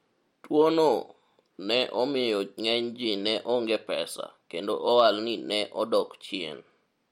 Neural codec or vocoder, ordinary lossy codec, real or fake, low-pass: none; MP3, 64 kbps; real; 19.8 kHz